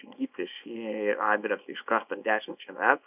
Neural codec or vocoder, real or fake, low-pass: codec, 24 kHz, 0.9 kbps, WavTokenizer, small release; fake; 3.6 kHz